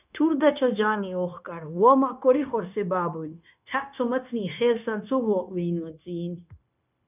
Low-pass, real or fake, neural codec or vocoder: 3.6 kHz; fake; codec, 16 kHz, 0.9 kbps, LongCat-Audio-Codec